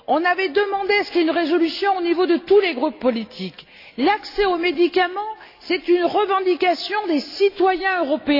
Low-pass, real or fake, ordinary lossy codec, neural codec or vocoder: 5.4 kHz; real; AAC, 32 kbps; none